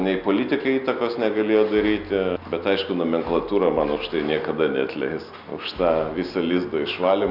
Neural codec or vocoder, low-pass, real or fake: none; 5.4 kHz; real